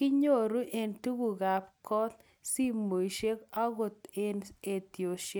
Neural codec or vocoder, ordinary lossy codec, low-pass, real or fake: none; none; none; real